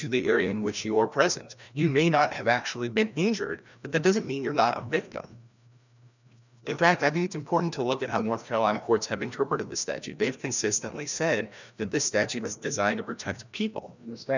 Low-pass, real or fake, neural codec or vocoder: 7.2 kHz; fake; codec, 16 kHz, 1 kbps, FreqCodec, larger model